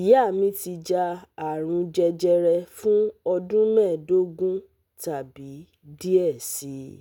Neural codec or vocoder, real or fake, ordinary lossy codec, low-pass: none; real; none; 19.8 kHz